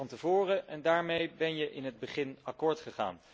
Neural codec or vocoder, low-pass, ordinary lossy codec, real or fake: none; none; none; real